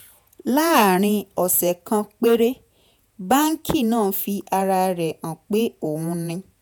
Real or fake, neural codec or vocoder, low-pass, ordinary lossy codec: fake; vocoder, 48 kHz, 128 mel bands, Vocos; none; none